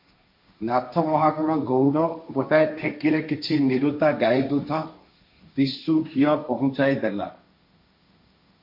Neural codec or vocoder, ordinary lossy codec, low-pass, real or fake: codec, 16 kHz, 1.1 kbps, Voila-Tokenizer; MP3, 32 kbps; 5.4 kHz; fake